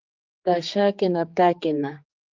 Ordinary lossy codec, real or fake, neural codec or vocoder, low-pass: Opus, 24 kbps; fake; codec, 16 kHz, 4 kbps, X-Codec, HuBERT features, trained on general audio; 7.2 kHz